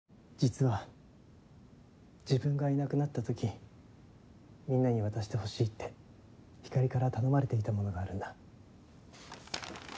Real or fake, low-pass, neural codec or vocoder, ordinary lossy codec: real; none; none; none